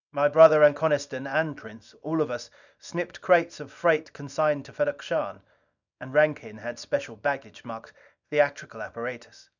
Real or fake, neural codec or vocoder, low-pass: fake; codec, 24 kHz, 0.9 kbps, WavTokenizer, small release; 7.2 kHz